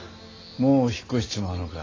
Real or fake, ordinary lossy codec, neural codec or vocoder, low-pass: real; AAC, 32 kbps; none; 7.2 kHz